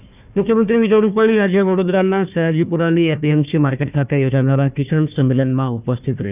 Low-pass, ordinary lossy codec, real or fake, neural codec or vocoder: 3.6 kHz; none; fake; codec, 16 kHz, 1 kbps, FunCodec, trained on Chinese and English, 50 frames a second